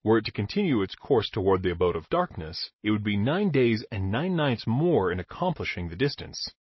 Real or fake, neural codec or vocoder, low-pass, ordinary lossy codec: real; none; 7.2 kHz; MP3, 24 kbps